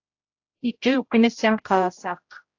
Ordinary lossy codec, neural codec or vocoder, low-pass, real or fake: AAC, 48 kbps; codec, 16 kHz, 0.5 kbps, X-Codec, HuBERT features, trained on general audio; 7.2 kHz; fake